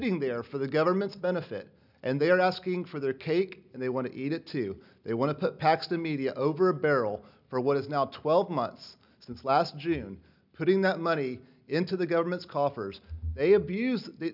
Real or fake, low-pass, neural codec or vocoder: real; 5.4 kHz; none